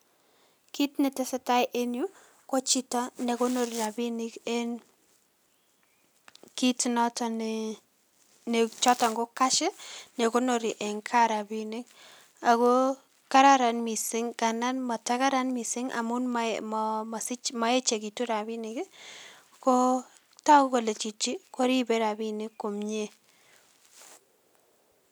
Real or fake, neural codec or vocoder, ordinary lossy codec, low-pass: real; none; none; none